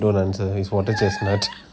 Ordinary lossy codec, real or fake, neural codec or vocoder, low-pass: none; real; none; none